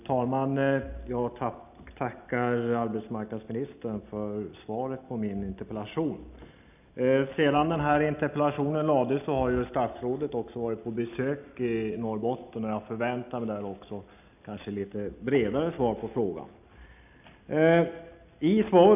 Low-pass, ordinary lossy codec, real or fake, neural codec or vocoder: 3.6 kHz; none; real; none